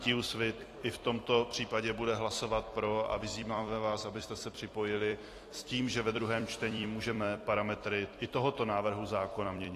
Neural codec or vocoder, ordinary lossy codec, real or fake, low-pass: vocoder, 44.1 kHz, 128 mel bands every 256 samples, BigVGAN v2; AAC, 48 kbps; fake; 14.4 kHz